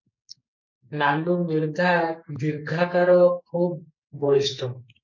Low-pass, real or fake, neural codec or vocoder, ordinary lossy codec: 7.2 kHz; fake; codec, 44.1 kHz, 2.6 kbps, SNAC; MP3, 64 kbps